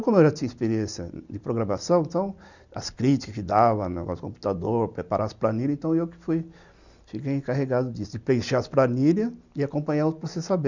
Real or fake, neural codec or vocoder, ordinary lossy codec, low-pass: real; none; none; 7.2 kHz